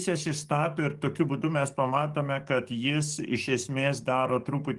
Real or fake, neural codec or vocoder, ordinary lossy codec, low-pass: fake; codec, 44.1 kHz, 7.8 kbps, DAC; Opus, 24 kbps; 10.8 kHz